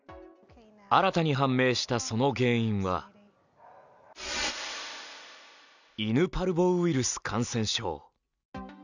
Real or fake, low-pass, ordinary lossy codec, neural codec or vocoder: real; 7.2 kHz; none; none